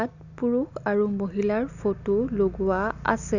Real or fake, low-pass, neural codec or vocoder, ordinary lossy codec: real; 7.2 kHz; none; none